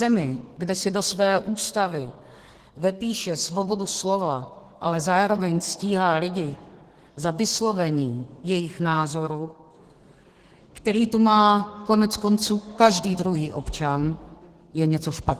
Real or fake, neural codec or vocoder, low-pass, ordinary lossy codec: fake; codec, 32 kHz, 1.9 kbps, SNAC; 14.4 kHz; Opus, 16 kbps